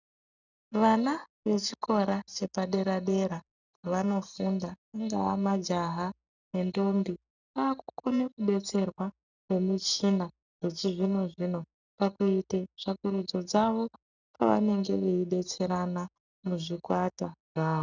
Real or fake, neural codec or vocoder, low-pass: real; none; 7.2 kHz